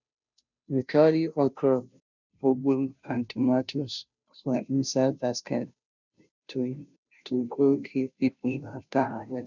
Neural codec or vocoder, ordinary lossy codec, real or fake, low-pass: codec, 16 kHz, 0.5 kbps, FunCodec, trained on Chinese and English, 25 frames a second; none; fake; 7.2 kHz